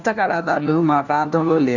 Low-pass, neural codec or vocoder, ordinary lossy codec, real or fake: 7.2 kHz; codec, 16 kHz, 1 kbps, FunCodec, trained on LibriTTS, 50 frames a second; none; fake